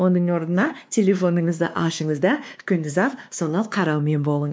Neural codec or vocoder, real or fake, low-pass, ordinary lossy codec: codec, 16 kHz, 2 kbps, X-Codec, WavLM features, trained on Multilingual LibriSpeech; fake; none; none